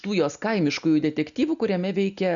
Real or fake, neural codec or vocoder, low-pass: real; none; 7.2 kHz